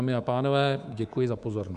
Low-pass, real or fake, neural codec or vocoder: 10.8 kHz; fake; codec, 24 kHz, 3.1 kbps, DualCodec